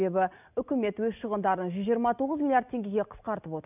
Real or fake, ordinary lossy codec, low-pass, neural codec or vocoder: real; none; 3.6 kHz; none